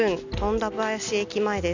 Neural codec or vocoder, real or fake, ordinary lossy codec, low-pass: none; real; none; 7.2 kHz